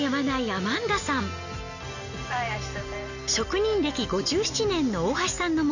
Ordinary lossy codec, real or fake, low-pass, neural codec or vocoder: none; real; 7.2 kHz; none